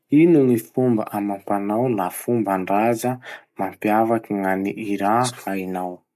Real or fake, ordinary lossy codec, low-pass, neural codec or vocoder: real; none; 14.4 kHz; none